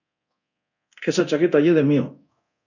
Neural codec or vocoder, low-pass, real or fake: codec, 24 kHz, 0.9 kbps, DualCodec; 7.2 kHz; fake